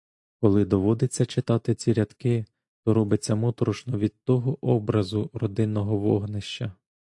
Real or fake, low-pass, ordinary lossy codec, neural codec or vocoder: real; 10.8 kHz; AAC, 64 kbps; none